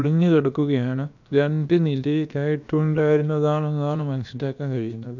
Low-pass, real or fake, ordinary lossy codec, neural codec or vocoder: 7.2 kHz; fake; none; codec, 16 kHz, about 1 kbps, DyCAST, with the encoder's durations